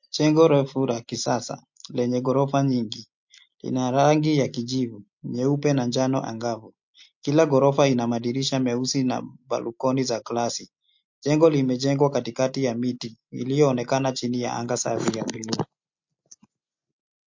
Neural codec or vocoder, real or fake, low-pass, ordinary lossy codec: none; real; 7.2 kHz; MP3, 48 kbps